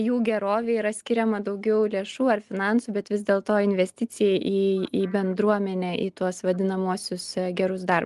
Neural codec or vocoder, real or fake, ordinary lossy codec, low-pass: none; real; Opus, 24 kbps; 10.8 kHz